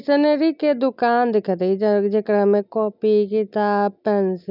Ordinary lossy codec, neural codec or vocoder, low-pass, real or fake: none; none; 5.4 kHz; real